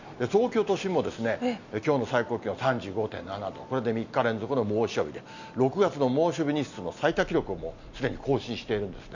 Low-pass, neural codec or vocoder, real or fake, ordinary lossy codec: 7.2 kHz; none; real; none